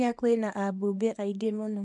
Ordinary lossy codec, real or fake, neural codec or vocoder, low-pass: MP3, 96 kbps; fake; codec, 24 kHz, 0.9 kbps, WavTokenizer, small release; 10.8 kHz